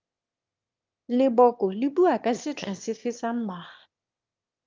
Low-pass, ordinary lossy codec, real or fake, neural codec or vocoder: 7.2 kHz; Opus, 24 kbps; fake; autoencoder, 22.05 kHz, a latent of 192 numbers a frame, VITS, trained on one speaker